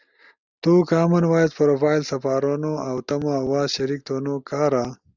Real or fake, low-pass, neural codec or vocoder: real; 7.2 kHz; none